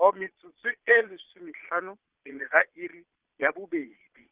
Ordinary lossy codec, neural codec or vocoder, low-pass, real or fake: Opus, 32 kbps; vocoder, 22.05 kHz, 80 mel bands, Vocos; 3.6 kHz; fake